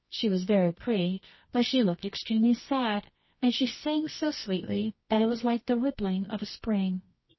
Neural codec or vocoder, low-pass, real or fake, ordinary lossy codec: codec, 24 kHz, 0.9 kbps, WavTokenizer, medium music audio release; 7.2 kHz; fake; MP3, 24 kbps